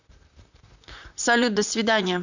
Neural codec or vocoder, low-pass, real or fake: vocoder, 44.1 kHz, 128 mel bands, Pupu-Vocoder; 7.2 kHz; fake